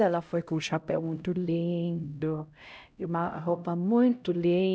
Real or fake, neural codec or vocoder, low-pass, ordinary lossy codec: fake; codec, 16 kHz, 0.5 kbps, X-Codec, HuBERT features, trained on LibriSpeech; none; none